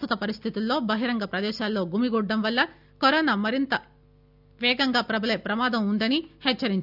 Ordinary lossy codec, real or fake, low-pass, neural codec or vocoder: none; real; 5.4 kHz; none